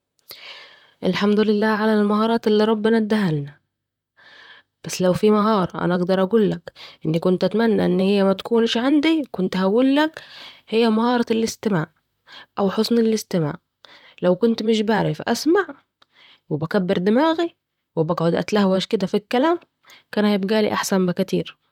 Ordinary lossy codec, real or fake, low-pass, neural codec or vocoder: none; fake; 19.8 kHz; vocoder, 44.1 kHz, 128 mel bands, Pupu-Vocoder